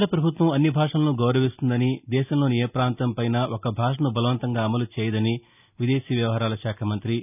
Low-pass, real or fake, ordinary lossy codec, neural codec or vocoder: 3.6 kHz; real; none; none